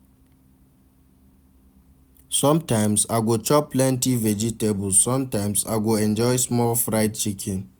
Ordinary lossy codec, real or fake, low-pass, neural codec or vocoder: none; real; none; none